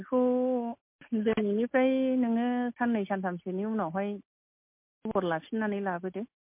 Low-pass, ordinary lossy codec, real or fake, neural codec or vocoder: 3.6 kHz; MP3, 32 kbps; real; none